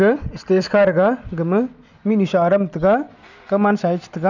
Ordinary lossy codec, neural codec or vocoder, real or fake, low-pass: none; none; real; 7.2 kHz